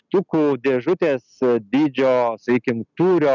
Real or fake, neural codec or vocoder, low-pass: real; none; 7.2 kHz